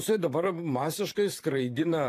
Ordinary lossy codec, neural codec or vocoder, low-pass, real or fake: AAC, 64 kbps; vocoder, 44.1 kHz, 128 mel bands, Pupu-Vocoder; 14.4 kHz; fake